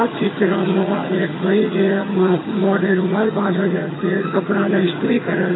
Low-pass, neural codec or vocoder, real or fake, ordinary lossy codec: 7.2 kHz; vocoder, 22.05 kHz, 80 mel bands, HiFi-GAN; fake; AAC, 16 kbps